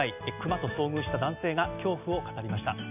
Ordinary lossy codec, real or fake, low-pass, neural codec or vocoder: none; real; 3.6 kHz; none